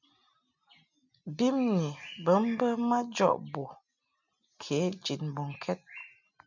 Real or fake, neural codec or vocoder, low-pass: real; none; 7.2 kHz